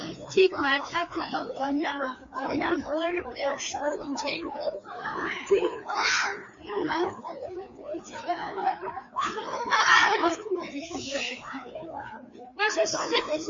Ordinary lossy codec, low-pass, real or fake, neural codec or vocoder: MP3, 48 kbps; 7.2 kHz; fake; codec, 16 kHz, 2 kbps, FreqCodec, larger model